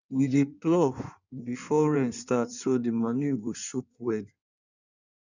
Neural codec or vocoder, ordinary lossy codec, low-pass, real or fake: codec, 16 kHz in and 24 kHz out, 1.1 kbps, FireRedTTS-2 codec; none; 7.2 kHz; fake